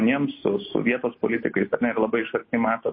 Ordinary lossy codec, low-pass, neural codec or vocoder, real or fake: MP3, 24 kbps; 7.2 kHz; none; real